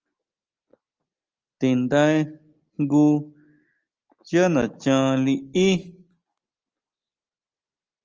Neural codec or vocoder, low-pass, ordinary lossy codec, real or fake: none; 7.2 kHz; Opus, 24 kbps; real